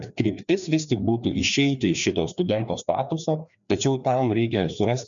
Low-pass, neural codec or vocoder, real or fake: 7.2 kHz; codec, 16 kHz, 2 kbps, FreqCodec, larger model; fake